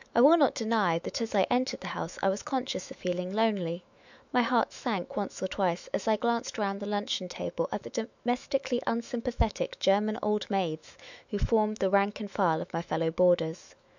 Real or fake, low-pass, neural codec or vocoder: fake; 7.2 kHz; autoencoder, 48 kHz, 128 numbers a frame, DAC-VAE, trained on Japanese speech